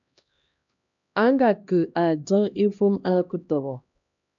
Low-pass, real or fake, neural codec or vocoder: 7.2 kHz; fake; codec, 16 kHz, 1 kbps, X-Codec, HuBERT features, trained on LibriSpeech